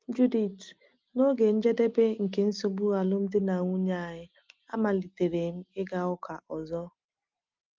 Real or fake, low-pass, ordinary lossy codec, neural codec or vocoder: real; 7.2 kHz; Opus, 24 kbps; none